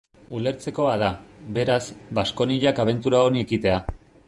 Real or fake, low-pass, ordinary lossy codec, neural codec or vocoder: fake; 10.8 kHz; MP3, 96 kbps; vocoder, 48 kHz, 128 mel bands, Vocos